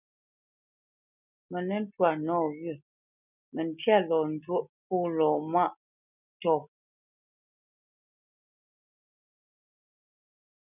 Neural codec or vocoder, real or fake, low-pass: none; real; 3.6 kHz